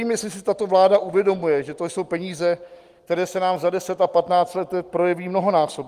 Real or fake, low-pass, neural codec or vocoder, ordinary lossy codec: real; 14.4 kHz; none; Opus, 24 kbps